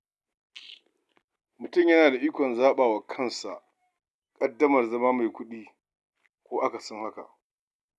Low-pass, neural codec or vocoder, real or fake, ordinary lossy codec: none; none; real; none